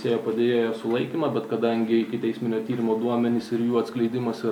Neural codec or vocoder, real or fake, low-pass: none; real; 19.8 kHz